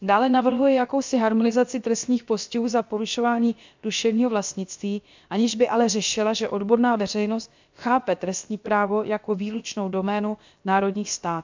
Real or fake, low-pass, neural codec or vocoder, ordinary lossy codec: fake; 7.2 kHz; codec, 16 kHz, about 1 kbps, DyCAST, with the encoder's durations; MP3, 64 kbps